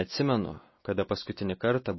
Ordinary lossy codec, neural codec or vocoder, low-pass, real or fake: MP3, 24 kbps; none; 7.2 kHz; real